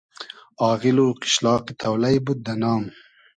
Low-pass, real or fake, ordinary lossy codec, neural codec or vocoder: 9.9 kHz; real; MP3, 96 kbps; none